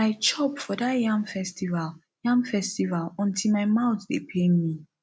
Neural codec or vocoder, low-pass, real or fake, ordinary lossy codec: none; none; real; none